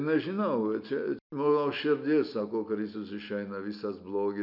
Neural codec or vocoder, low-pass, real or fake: none; 5.4 kHz; real